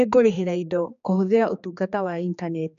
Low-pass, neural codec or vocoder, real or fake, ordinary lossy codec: 7.2 kHz; codec, 16 kHz, 2 kbps, X-Codec, HuBERT features, trained on general audio; fake; none